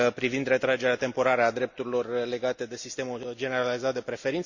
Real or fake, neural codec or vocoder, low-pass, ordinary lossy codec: real; none; 7.2 kHz; Opus, 64 kbps